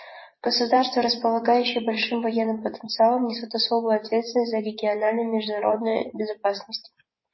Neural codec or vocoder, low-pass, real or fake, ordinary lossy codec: vocoder, 24 kHz, 100 mel bands, Vocos; 7.2 kHz; fake; MP3, 24 kbps